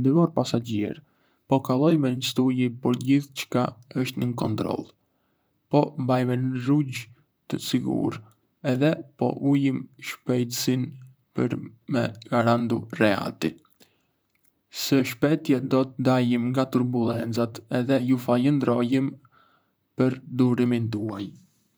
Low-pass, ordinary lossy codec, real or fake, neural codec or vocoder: none; none; fake; vocoder, 44.1 kHz, 128 mel bands, Pupu-Vocoder